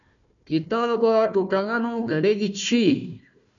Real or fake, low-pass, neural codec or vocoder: fake; 7.2 kHz; codec, 16 kHz, 1 kbps, FunCodec, trained on Chinese and English, 50 frames a second